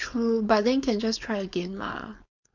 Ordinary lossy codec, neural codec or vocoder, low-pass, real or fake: none; codec, 16 kHz, 4.8 kbps, FACodec; 7.2 kHz; fake